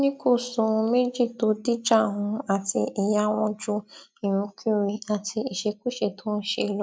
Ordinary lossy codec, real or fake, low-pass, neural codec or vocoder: none; real; none; none